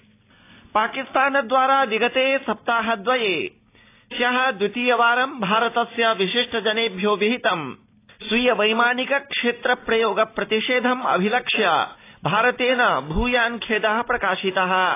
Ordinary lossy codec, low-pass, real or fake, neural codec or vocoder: AAC, 24 kbps; 3.6 kHz; real; none